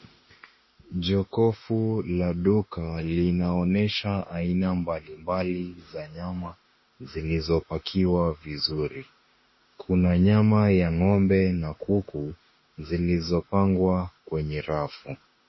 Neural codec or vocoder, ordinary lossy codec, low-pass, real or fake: autoencoder, 48 kHz, 32 numbers a frame, DAC-VAE, trained on Japanese speech; MP3, 24 kbps; 7.2 kHz; fake